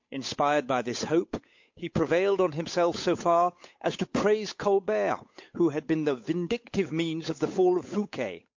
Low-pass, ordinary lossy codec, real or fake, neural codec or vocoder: 7.2 kHz; MP3, 48 kbps; fake; codec, 16 kHz, 8 kbps, FunCodec, trained on Chinese and English, 25 frames a second